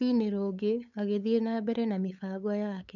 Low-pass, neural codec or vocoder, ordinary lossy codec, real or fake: 7.2 kHz; codec, 16 kHz, 8 kbps, FunCodec, trained on LibriTTS, 25 frames a second; none; fake